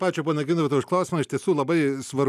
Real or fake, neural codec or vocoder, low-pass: real; none; 14.4 kHz